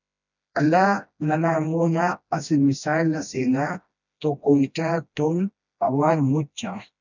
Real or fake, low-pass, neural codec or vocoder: fake; 7.2 kHz; codec, 16 kHz, 1 kbps, FreqCodec, smaller model